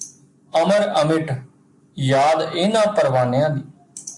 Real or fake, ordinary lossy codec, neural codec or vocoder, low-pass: real; AAC, 64 kbps; none; 10.8 kHz